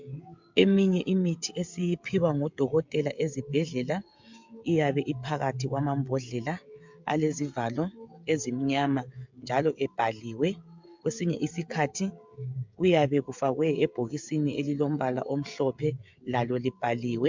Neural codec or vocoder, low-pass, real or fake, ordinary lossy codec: codec, 16 kHz, 16 kbps, FreqCodec, smaller model; 7.2 kHz; fake; MP3, 64 kbps